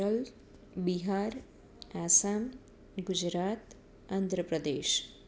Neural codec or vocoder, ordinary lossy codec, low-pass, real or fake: none; none; none; real